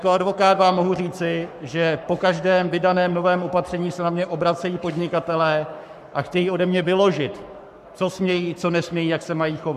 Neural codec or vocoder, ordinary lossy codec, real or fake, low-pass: codec, 44.1 kHz, 7.8 kbps, Pupu-Codec; MP3, 96 kbps; fake; 14.4 kHz